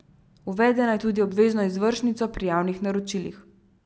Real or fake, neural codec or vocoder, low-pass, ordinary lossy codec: real; none; none; none